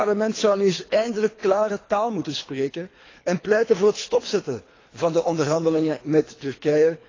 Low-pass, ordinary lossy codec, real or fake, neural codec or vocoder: 7.2 kHz; AAC, 32 kbps; fake; codec, 24 kHz, 3 kbps, HILCodec